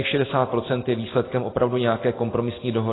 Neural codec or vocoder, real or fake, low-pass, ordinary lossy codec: none; real; 7.2 kHz; AAC, 16 kbps